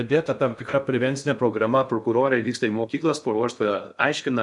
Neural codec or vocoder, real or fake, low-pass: codec, 16 kHz in and 24 kHz out, 0.6 kbps, FocalCodec, streaming, 2048 codes; fake; 10.8 kHz